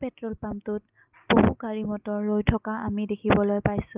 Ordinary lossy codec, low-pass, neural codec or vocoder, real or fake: Opus, 32 kbps; 3.6 kHz; none; real